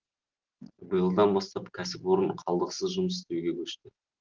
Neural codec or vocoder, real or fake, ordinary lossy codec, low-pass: none; real; Opus, 16 kbps; 7.2 kHz